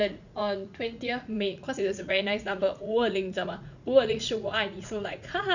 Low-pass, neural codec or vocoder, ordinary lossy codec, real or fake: 7.2 kHz; vocoder, 44.1 kHz, 80 mel bands, Vocos; none; fake